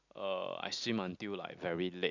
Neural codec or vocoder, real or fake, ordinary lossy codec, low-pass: none; real; none; 7.2 kHz